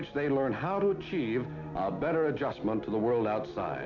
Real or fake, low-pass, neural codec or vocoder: real; 7.2 kHz; none